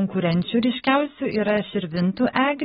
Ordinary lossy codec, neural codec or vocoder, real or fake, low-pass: AAC, 16 kbps; none; real; 19.8 kHz